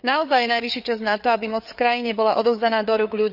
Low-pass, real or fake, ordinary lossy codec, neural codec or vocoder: 5.4 kHz; fake; none; codec, 16 kHz, 4 kbps, FreqCodec, larger model